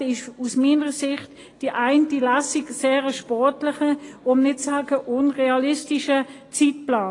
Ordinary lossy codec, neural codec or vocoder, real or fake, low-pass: AAC, 32 kbps; none; real; 10.8 kHz